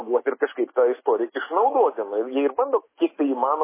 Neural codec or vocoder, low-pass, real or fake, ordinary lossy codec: none; 3.6 kHz; real; MP3, 16 kbps